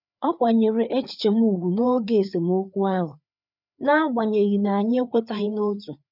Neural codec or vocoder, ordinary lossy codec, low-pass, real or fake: codec, 16 kHz, 4 kbps, FreqCodec, larger model; none; 5.4 kHz; fake